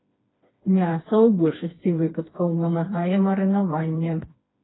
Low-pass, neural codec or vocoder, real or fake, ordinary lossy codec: 7.2 kHz; codec, 16 kHz, 2 kbps, FreqCodec, smaller model; fake; AAC, 16 kbps